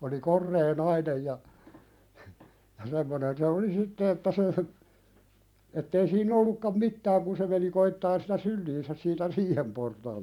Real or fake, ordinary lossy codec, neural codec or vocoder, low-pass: fake; none; vocoder, 44.1 kHz, 128 mel bands every 512 samples, BigVGAN v2; 19.8 kHz